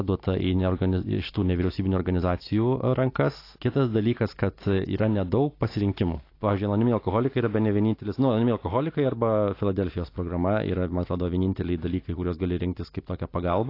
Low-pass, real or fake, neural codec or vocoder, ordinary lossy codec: 5.4 kHz; real; none; AAC, 32 kbps